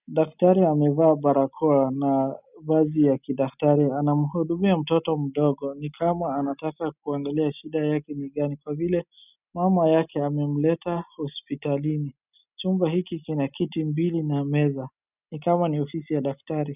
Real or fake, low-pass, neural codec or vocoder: real; 3.6 kHz; none